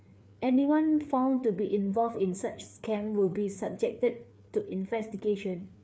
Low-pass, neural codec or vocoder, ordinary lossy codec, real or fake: none; codec, 16 kHz, 4 kbps, FreqCodec, larger model; none; fake